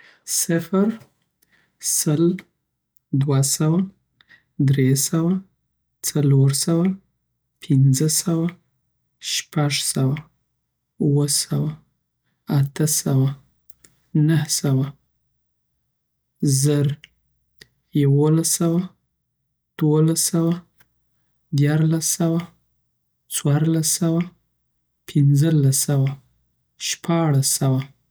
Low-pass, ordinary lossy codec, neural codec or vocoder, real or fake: none; none; none; real